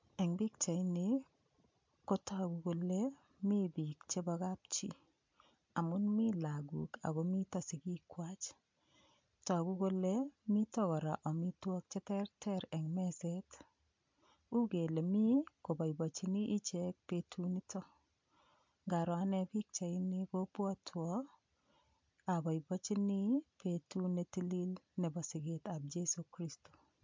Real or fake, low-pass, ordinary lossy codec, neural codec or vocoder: real; 7.2 kHz; MP3, 64 kbps; none